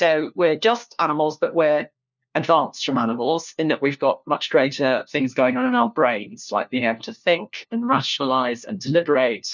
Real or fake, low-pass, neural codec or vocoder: fake; 7.2 kHz; codec, 16 kHz, 1 kbps, FunCodec, trained on LibriTTS, 50 frames a second